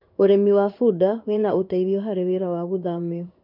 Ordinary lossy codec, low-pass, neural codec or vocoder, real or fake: none; 5.4 kHz; codec, 16 kHz in and 24 kHz out, 1 kbps, XY-Tokenizer; fake